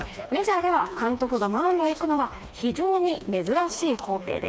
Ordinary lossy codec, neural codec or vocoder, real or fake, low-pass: none; codec, 16 kHz, 2 kbps, FreqCodec, smaller model; fake; none